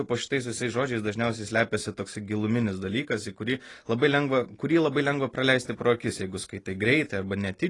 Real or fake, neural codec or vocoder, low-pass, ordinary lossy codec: real; none; 10.8 kHz; AAC, 32 kbps